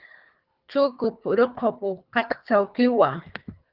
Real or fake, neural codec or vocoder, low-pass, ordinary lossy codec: fake; codec, 24 kHz, 3 kbps, HILCodec; 5.4 kHz; Opus, 32 kbps